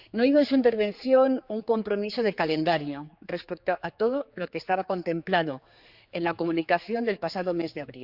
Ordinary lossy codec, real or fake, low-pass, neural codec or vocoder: Opus, 64 kbps; fake; 5.4 kHz; codec, 16 kHz, 4 kbps, X-Codec, HuBERT features, trained on general audio